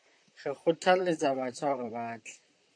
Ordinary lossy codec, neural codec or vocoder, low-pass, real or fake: AAC, 48 kbps; vocoder, 44.1 kHz, 128 mel bands, Pupu-Vocoder; 9.9 kHz; fake